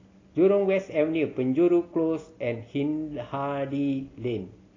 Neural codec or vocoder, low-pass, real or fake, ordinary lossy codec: none; 7.2 kHz; real; AAC, 32 kbps